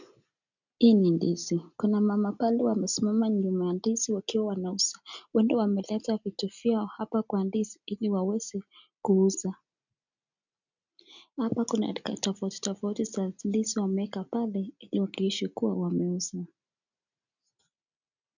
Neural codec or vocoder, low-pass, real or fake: none; 7.2 kHz; real